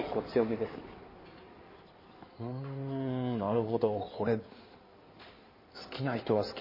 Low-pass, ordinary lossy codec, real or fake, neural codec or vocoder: 5.4 kHz; MP3, 24 kbps; fake; codec, 16 kHz in and 24 kHz out, 2.2 kbps, FireRedTTS-2 codec